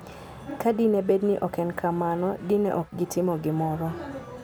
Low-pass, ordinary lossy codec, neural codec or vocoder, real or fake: none; none; none; real